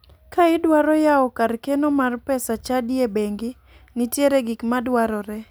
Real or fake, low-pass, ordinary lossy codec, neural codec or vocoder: real; none; none; none